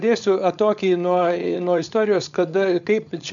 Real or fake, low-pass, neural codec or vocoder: fake; 7.2 kHz; codec, 16 kHz, 4.8 kbps, FACodec